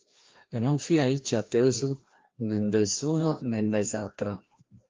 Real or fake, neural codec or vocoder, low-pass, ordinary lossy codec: fake; codec, 16 kHz, 1 kbps, FreqCodec, larger model; 7.2 kHz; Opus, 32 kbps